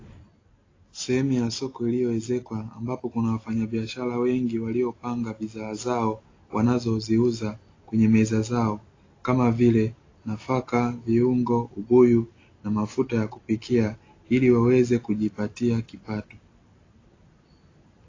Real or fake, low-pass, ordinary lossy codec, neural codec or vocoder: real; 7.2 kHz; AAC, 32 kbps; none